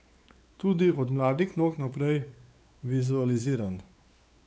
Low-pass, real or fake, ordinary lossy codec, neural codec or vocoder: none; fake; none; codec, 16 kHz, 4 kbps, X-Codec, WavLM features, trained on Multilingual LibriSpeech